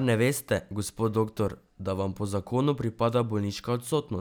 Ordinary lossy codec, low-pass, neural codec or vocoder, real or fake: none; none; none; real